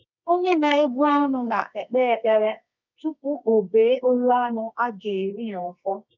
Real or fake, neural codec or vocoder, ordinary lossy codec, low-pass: fake; codec, 24 kHz, 0.9 kbps, WavTokenizer, medium music audio release; none; 7.2 kHz